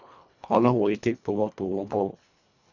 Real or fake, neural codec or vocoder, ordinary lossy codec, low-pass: fake; codec, 24 kHz, 1.5 kbps, HILCodec; none; 7.2 kHz